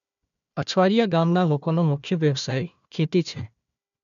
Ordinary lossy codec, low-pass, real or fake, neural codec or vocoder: none; 7.2 kHz; fake; codec, 16 kHz, 1 kbps, FunCodec, trained on Chinese and English, 50 frames a second